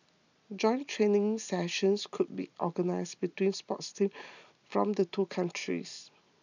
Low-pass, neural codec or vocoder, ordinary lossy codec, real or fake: 7.2 kHz; none; none; real